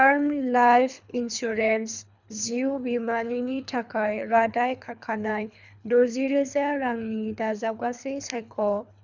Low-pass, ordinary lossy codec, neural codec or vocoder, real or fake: 7.2 kHz; none; codec, 24 kHz, 3 kbps, HILCodec; fake